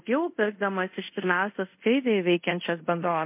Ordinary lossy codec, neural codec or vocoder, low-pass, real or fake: MP3, 32 kbps; codec, 24 kHz, 0.5 kbps, DualCodec; 3.6 kHz; fake